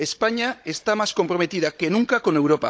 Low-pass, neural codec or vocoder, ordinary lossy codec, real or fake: none; codec, 16 kHz, 16 kbps, FunCodec, trained on Chinese and English, 50 frames a second; none; fake